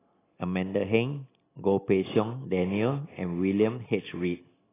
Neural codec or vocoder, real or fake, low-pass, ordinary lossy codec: none; real; 3.6 kHz; AAC, 16 kbps